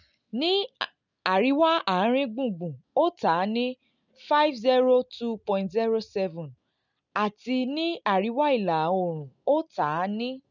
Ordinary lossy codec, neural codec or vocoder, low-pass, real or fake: none; none; 7.2 kHz; real